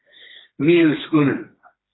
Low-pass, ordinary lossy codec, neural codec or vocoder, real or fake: 7.2 kHz; AAC, 16 kbps; codec, 16 kHz, 2 kbps, FreqCodec, smaller model; fake